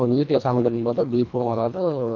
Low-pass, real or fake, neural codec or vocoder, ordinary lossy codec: 7.2 kHz; fake; codec, 24 kHz, 1.5 kbps, HILCodec; none